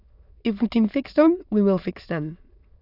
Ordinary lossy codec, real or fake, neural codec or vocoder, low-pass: none; fake; autoencoder, 22.05 kHz, a latent of 192 numbers a frame, VITS, trained on many speakers; 5.4 kHz